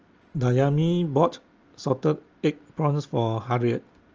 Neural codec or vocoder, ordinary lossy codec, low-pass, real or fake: none; Opus, 24 kbps; 7.2 kHz; real